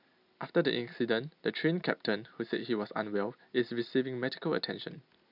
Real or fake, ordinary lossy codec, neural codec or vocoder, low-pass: real; AAC, 48 kbps; none; 5.4 kHz